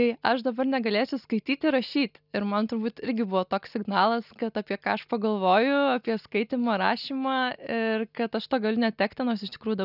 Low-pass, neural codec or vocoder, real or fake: 5.4 kHz; none; real